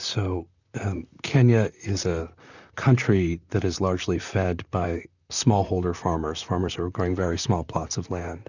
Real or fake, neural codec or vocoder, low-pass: fake; vocoder, 44.1 kHz, 128 mel bands, Pupu-Vocoder; 7.2 kHz